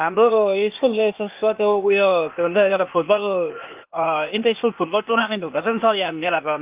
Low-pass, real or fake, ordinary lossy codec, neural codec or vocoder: 3.6 kHz; fake; Opus, 32 kbps; codec, 16 kHz, 0.8 kbps, ZipCodec